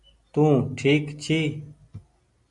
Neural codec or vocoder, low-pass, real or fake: none; 10.8 kHz; real